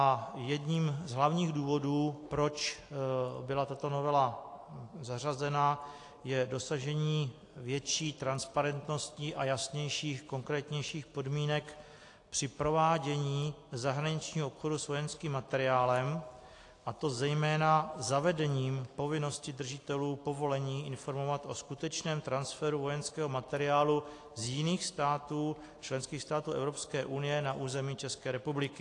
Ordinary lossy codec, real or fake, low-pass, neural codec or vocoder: AAC, 48 kbps; real; 10.8 kHz; none